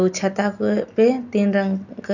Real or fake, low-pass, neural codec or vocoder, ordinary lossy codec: real; 7.2 kHz; none; none